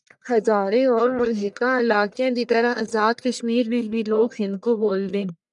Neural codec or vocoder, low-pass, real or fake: codec, 44.1 kHz, 1.7 kbps, Pupu-Codec; 10.8 kHz; fake